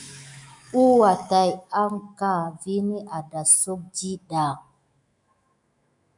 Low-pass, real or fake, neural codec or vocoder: 10.8 kHz; fake; autoencoder, 48 kHz, 128 numbers a frame, DAC-VAE, trained on Japanese speech